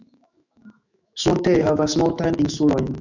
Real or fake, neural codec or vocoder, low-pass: fake; autoencoder, 48 kHz, 128 numbers a frame, DAC-VAE, trained on Japanese speech; 7.2 kHz